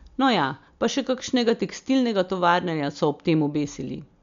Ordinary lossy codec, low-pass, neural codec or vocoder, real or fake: MP3, 64 kbps; 7.2 kHz; none; real